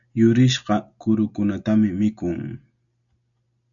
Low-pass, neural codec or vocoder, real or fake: 7.2 kHz; none; real